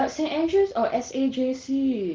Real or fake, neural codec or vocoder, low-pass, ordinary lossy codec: real; none; 7.2 kHz; Opus, 16 kbps